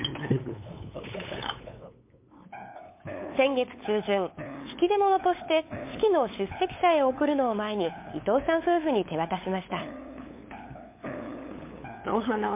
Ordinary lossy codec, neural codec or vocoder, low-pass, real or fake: MP3, 24 kbps; codec, 16 kHz, 8 kbps, FunCodec, trained on LibriTTS, 25 frames a second; 3.6 kHz; fake